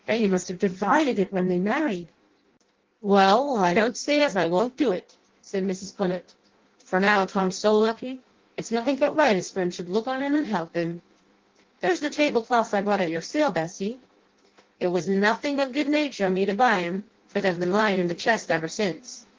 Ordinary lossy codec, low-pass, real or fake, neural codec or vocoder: Opus, 16 kbps; 7.2 kHz; fake; codec, 16 kHz in and 24 kHz out, 0.6 kbps, FireRedTTS-2 codec